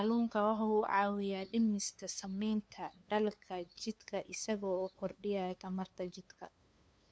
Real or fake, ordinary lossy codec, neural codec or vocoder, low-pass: fake; none; codec, 16 kHz, 2 kbps, FunCodec, trained on LibriTTS, 25 frames a second; none